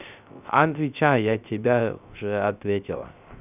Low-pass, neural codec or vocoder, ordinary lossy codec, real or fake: 3.6 kHz; codec, 16 kHz, 0.3 kbps, FocalCodec; none; fake